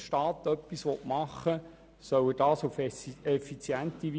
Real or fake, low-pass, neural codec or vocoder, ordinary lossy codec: real; none; none; none